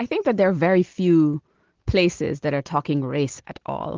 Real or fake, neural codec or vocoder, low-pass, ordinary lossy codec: real; none; 7.2 kHz; Opus, 16 kbps